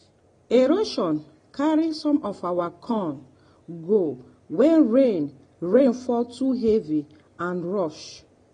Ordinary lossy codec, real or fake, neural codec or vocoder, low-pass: AAC, 32 kbps; real; none; 9.9 kHz